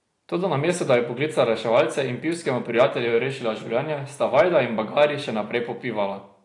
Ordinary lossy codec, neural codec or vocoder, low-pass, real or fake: AAC, 48 kbps; none; 10.8 kHz; real